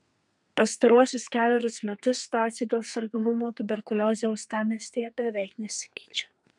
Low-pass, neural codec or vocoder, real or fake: 10.8 kHz; codec, 32 kHz, 1.9 kbps, SNAC; fake